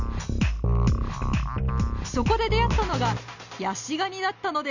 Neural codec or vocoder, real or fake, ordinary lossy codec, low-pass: none; real; none; 7.2 kHz